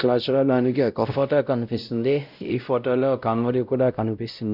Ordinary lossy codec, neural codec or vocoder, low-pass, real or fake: none; codec, 16 kHz, 0.5 kbps, X-Codec, WavLM features, trained on Multilingual LibriSpeech; 5.4 kHz; fake